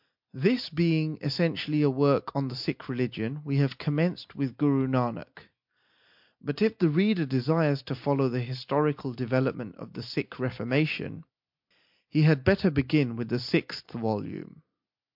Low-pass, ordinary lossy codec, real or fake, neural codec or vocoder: 5.4 kHz; MP3, 48 kbps; real; none